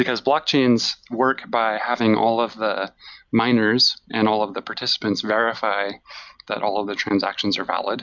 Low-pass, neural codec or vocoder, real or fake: 7.2 kHz; none; real